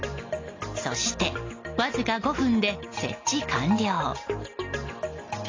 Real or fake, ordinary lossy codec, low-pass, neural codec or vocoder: real; AAC, 32 kbps; 7.2 kHz; none